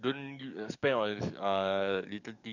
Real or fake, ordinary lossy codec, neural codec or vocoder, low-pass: fake; none; codec, 44.1 kHz, 7.8 kbps, DAC; 7.2 kHz